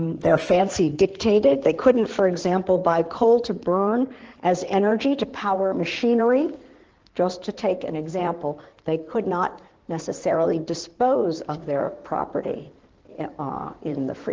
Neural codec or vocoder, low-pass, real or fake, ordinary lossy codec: codec, 16 kHz in and 24 kHz out, 2.2 kbps, FireRedTTS-2 codec; 7.2 kHz; fake; Opus, 16 kbps